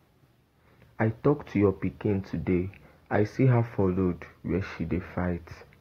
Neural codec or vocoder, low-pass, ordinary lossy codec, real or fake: none; 19.8 kHz; AAC, 48 kbps; real